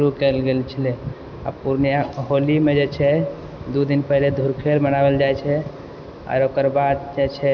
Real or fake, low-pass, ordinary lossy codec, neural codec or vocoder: real; 7.2 kHz; none; none